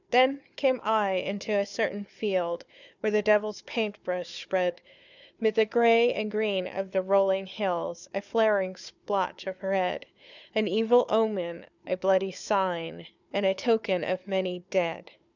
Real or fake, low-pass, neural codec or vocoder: fake; 7.2 kHz; codec, 16 kHz, 4 kbps, FunCodec, trained on Chinese and English, 50 frames a second